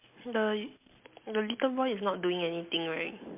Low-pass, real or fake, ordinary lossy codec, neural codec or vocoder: 3.6 kHz; real; MP3, 32 kbps; none